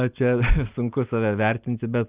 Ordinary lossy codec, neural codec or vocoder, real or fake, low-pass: Opus, 32 kbps; none; real; 3.6 kHz